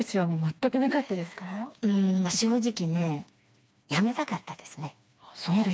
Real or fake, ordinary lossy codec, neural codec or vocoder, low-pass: fake; none; codec, 16 kHz, 2 kbps, FreqCodec, smaller model; none